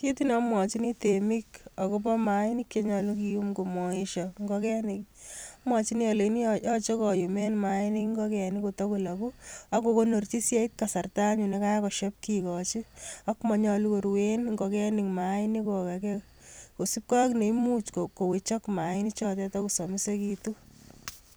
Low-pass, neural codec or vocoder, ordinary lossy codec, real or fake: none; vocoder, 44.1 kHz, 128 mel bands every 256 samples, BigVGAN v2; none; fake